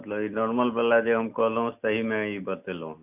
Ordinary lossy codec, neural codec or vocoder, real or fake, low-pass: none; none; real; 3.6 kHz